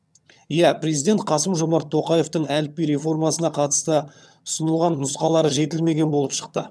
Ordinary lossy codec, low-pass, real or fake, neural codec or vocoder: none; none; fake; vocoder, 22.05 kHz, 80 mel bands, HiFi-GAN